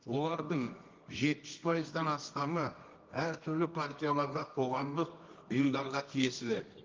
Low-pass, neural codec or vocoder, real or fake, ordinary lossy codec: 7.2 kHz; codec, 24 kHz, 0.9 kbps, WavTokenizer, medium music audio release; fake; Opus, 32 kbps